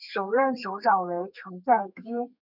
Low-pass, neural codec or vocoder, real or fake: 5.4 kHz; codec, 32 kHz, 1.9 kbps, SNAC; fake